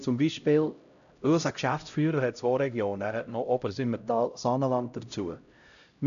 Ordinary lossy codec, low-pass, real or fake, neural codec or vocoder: none; 7.2 kHz; fake; codec, 16 kHz, 0.5 kbps, X-Codec, HuBERT features, trained on LibriSpeech